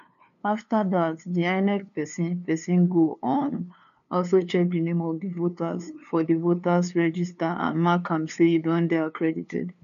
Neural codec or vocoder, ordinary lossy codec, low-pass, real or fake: codec, 16 kHz, 2 kbps, FunCodec, trained on LibriTTS, 25 frames a second; none; 7.2 kHz; fake